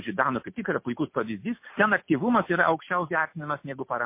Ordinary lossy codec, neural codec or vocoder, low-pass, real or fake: MP3, 24 kbps; none; 3.6 kHz; real